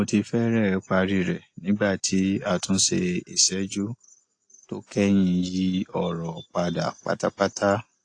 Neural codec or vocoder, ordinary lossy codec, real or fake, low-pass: none; AAC, 48 kbps; real; 9.9 kHz